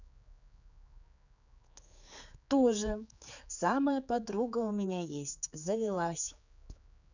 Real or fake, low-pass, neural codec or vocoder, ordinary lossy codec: fake; 7.2 kHz; codec, 16 kHz, 4 kbps, X-Codec, HuBERT features, trained on general audio; none